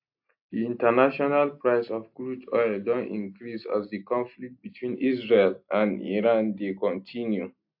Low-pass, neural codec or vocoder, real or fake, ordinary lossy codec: 5.4 kHz; none; real; AAC, 48 kbps